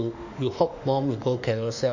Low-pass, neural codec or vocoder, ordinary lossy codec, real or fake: 7.2 kHz; autoencoder, 48 kHz, 32 numbers a frame, DAC-VAE, trained on Japanese speech; none; fake